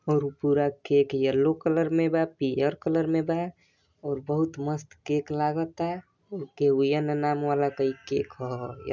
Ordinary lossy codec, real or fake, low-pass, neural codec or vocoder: none; real; 7.2 kHz; none